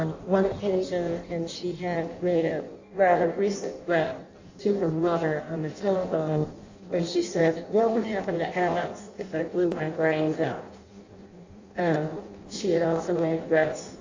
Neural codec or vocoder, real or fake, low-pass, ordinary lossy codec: codec, 16 kHz in and 24 kHz out, 0.6 kbps, FireRedTTS-2 codec; fake; 7.2 kHz; AAC, 48 kbps